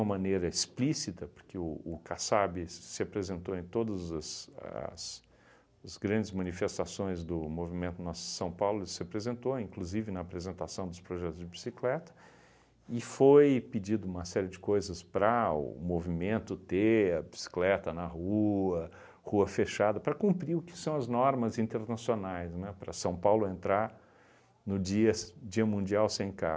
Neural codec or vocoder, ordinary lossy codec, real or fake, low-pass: none; none; real; none